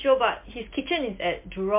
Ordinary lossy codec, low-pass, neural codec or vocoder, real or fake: MP3, 24 kbps; 3.6 kHz; none; real